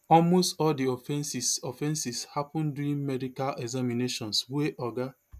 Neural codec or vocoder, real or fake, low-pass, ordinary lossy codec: none; real; 14.4 kHz; none